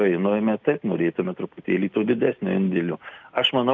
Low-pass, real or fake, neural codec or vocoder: 7.2 kHz; real; none